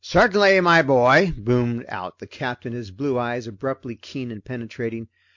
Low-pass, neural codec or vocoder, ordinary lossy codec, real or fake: 7.2 kHz; none; MP3, 48 kbps; real